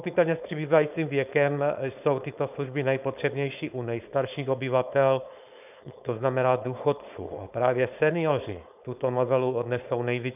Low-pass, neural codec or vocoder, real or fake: 3.6 kHz; codec, 16 kHz, 4.8 kbps, FACodec; fake